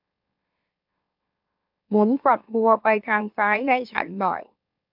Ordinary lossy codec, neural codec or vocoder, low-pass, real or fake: AAC, 48 kbps; autoencoder, 44.1 kHz, a latent of 192 numbers a frame, MeloTTS; 5.4 kHz; fake